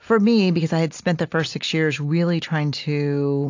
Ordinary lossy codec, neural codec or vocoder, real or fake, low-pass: AAC, 48 kbps; none; real; 7.2 kHz